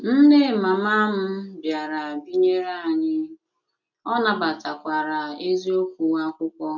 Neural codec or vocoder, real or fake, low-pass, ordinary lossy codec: none; real; 7.2 kHz; none